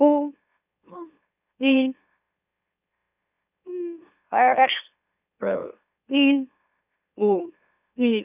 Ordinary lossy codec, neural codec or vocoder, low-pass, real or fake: none; autoencoder, 44.1 kHz, a latent of 192 numbers a frame, MeloTTS; 3.6 kHz; fake